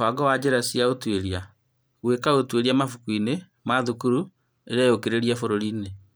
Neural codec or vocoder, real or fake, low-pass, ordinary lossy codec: vocoder, 44.1 kHz, 128 mel bands every 512 samples, BigVGAN v2; fake; none; none